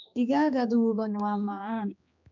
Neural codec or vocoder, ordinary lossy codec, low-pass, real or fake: codec, 16 kHz, 2 kbps, X-Codec, HuBERT features, trained on general audio; none; 7.2 kHz; fake